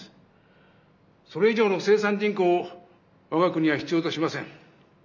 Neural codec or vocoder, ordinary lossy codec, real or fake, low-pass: none; none; real; 7.2 kHz